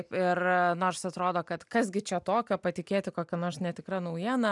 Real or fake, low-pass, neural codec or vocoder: real; 10.8 kHz; none